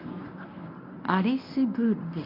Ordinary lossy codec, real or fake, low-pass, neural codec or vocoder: none; fake; 5.4 kHz; codec, 24 kHz, 0.9 kbps, WavTokenizer, medium speech release version 1